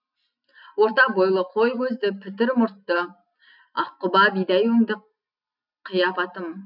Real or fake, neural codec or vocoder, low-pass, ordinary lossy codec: real; none; 5.4 kHz; none